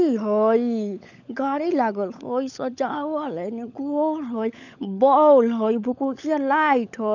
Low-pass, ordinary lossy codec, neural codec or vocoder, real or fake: 7.2 kHz; none; codec, 16 kHz, 16 kbps, FunCodec, trained on LibriTTS, 50 frames a second; fake